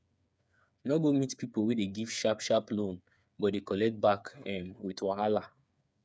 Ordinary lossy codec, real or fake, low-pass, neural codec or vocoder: none; fake; none; codec, 16 kHz, 8 kbps, FreqCodec, smaller model